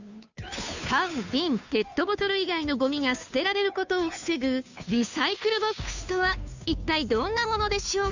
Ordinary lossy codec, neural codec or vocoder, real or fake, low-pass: none; codec, 16 kHz, 2 kbps, FunCodec, trained on Chinese and English, 25 frames a second; fake; 7.2 kHz